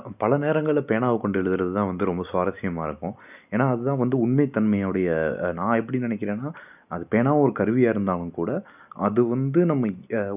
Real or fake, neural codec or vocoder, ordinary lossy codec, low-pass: real; none; none; 3.6 kHz